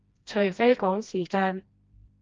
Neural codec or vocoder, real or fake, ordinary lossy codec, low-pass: codec, 16 kHz, 1 kbps, FreqCodec, smaller model; fake; Opus, 24 kbps; 7.2 kHz